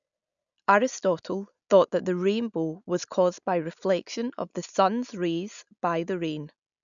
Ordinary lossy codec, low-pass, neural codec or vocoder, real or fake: none; 7.2 kHz; none; real